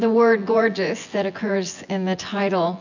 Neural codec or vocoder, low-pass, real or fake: vocoder, 24 kHz, 100 mel bands, Vocos; 7.2 kHz; fake